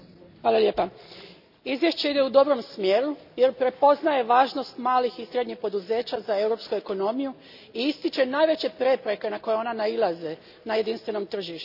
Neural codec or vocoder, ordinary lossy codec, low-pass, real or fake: none; none; 5.4 kHz; real